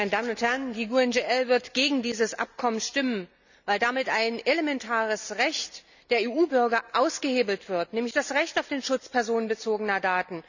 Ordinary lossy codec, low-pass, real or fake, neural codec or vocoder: none; 7.2 kHz; real; none